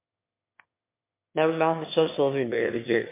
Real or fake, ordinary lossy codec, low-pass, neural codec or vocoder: fake; MP3, 24 kbps; 3.6 kHz; autoencoder, 22.05 kHz, a latent of 192 numbers a frame, VITS, trained on one speaker